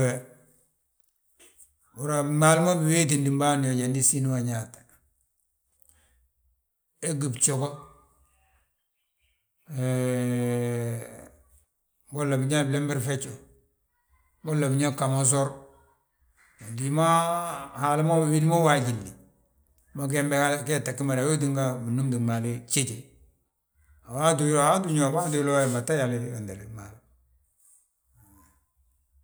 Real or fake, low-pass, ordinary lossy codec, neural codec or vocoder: real; none; none; none